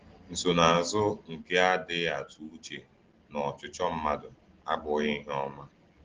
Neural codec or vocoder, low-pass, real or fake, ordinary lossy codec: none; 7.2 kHz; real; Opus, 16 kbps